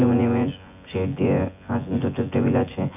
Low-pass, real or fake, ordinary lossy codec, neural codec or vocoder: 3.6 kHz; fake; none; vocoder, 24 kHz, 100 mel bands, Vocos